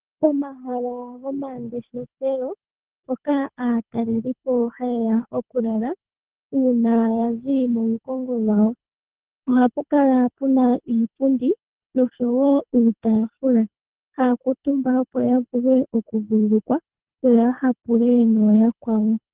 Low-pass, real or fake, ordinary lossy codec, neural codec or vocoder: 3.6 kHz; fake; Opus, 16 kbps; codec, 24 kHz, 3 kbps, HILCodec